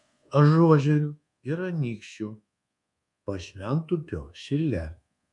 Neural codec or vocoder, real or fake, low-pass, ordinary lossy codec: codec, 24 kHz, 1.2 kbps, DualCodec; fake; 10.8 kHz; MP3, 64 kbps